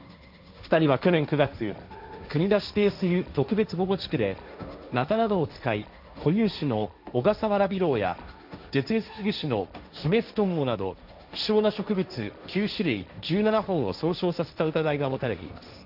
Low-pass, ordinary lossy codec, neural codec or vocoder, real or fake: 5.4 kHz; none; codec, 16 kHz, 1.1 kbps, Voila-Tokenizer; fake